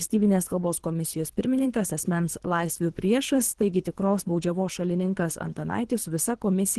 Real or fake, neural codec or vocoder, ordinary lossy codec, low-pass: fake; codec, 24 kHz, 3 kbps, HILCodec; Opus, 16 kbps; 10.8 kHz